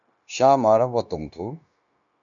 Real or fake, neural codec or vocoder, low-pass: fake; codec, 16 kHz, 0.9 kbps, LongCat-Audio-Codec; 7.2 kHz